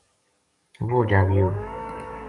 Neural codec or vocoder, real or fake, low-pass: codec, 44.1 kHz, 7.8 kbps, DAC; fake; 10.8 kHz